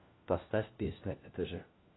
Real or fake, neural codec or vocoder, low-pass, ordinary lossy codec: fake; codec, 16 kHz, 1 kbps, FunCodec, trained on LibriTTS, 50 frames a second; 7.2 kHz; AAC, 16 kbps